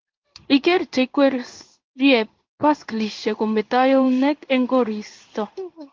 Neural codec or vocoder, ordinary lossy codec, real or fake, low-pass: codec, 16 kHz in and 24 kHz out, 1 kbps, XY-Tokenizer; Opus, 32 kbps; fake; 7.2 kHz